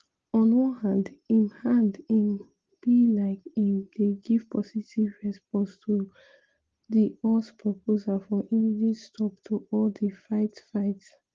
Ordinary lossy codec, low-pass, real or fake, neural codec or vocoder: Opus, 16 kbps; 7.2 kHz; real; none